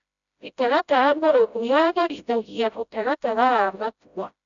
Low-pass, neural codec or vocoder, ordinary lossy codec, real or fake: 7.2 kHz; codec, 16 kHz, 0.5 kbps, FreqCodec, smaller model; none; fake